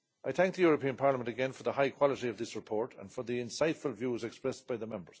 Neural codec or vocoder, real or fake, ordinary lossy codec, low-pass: none; real; none; none